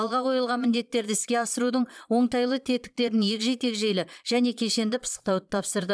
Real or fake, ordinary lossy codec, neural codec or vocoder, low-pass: fake; none; vocoder, 22.05 kHz, 80 mel bands, Vocos; none